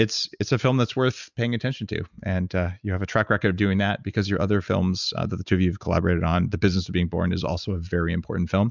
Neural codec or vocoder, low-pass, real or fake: none; 7.2 kHz; real